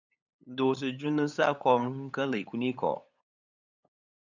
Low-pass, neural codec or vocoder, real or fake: 7.2 kHz; codec, 16 kHz, 8 kbps, FunCodec, trained on LibriTTS, 25 frames a second; fake